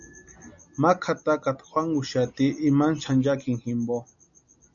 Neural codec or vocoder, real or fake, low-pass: none; real; 7.2 kHz